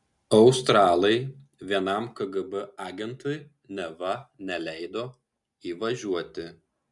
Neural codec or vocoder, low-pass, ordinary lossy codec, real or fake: none; 10.8 kHz; MP3, 96 kbps; real